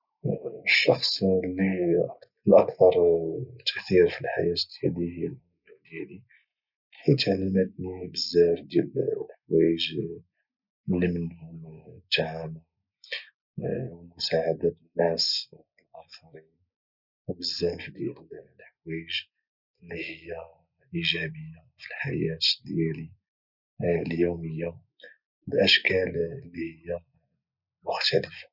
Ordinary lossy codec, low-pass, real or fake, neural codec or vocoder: none; 5.4 kHz; real; none